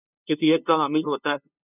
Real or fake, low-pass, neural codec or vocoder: fake; 3.6 kHz; codec, 16 kHz, 2 kbps, FunCodec, trained on LibriTTS, 25 frames a second